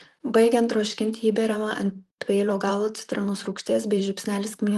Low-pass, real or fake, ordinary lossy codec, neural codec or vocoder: 14.4 kHz; fake; Opus, 24 kbps; vocoder, 44.1 kHz, 128 mel bands, Pupu-Vocoder